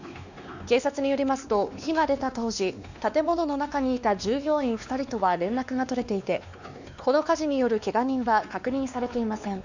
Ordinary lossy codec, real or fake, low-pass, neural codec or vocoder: none; fake; 7.2 kHz; codec, 16 kHz, 2 kbps, X-Codec, WavLM features, trained on Multilingual LibriSpeech